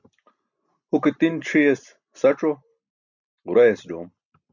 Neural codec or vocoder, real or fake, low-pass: none; real; 7.2 kHz